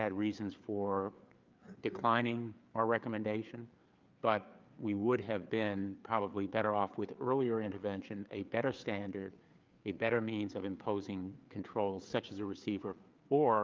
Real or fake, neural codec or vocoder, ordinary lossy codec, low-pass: fake; codec, 16 kHz, 4 kbps, FreqCodec, larger model; Opus, 24 kbps; 7.2 kHz